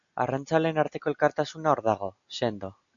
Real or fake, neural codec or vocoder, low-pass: real; none; 7.2 kHz